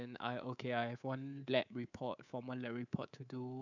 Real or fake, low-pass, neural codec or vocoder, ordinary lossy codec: fake; 7.2 kHz; codec, 24 kHz, 3.1 kbps, DualCodec; none